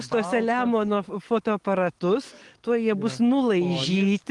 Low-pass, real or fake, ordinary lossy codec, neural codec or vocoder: 10.8 kHz; fake; Opus, 24 kbps; codec, 44.1 kHz, 7.8 kbps, DAC